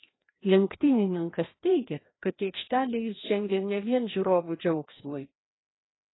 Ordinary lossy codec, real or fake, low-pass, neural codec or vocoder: AAC, 16 kbps; fake; 7.2 kHz; codec, 16 kHz, 1 kbps, FreqCodec, larger model